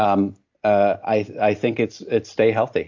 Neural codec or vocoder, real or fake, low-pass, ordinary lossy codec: none; real; 7.2 kHz; AAC, 48 kbps